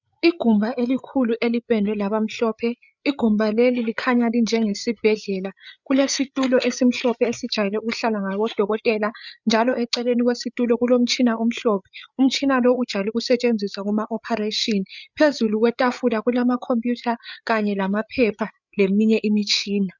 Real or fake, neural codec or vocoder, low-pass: fake; codec, 16 kHz, 8 kbps, FreqCodec, larger model; 7.2 kHz